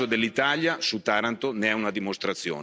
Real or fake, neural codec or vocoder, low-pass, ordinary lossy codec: real; none; none; none